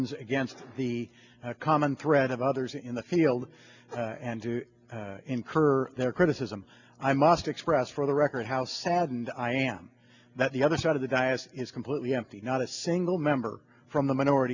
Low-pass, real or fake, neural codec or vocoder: 7.2 kHz; real; none